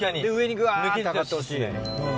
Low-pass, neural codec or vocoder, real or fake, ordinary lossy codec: none; none; real; none